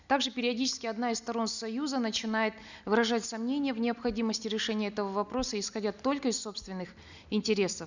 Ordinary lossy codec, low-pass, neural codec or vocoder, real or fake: none; 7.2 kHz; none; real